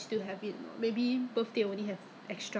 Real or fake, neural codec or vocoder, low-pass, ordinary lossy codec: real; none; none; none